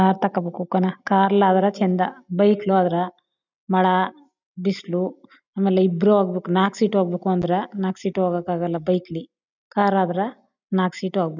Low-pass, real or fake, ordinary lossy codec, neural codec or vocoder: 7.2 kHz; real; none; none